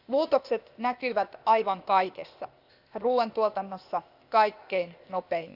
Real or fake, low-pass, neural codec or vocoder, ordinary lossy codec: fake; 5.4 kHz; codec, 16 kHz, 0.8 kbps, ZipCodec; Opus, 64 kbps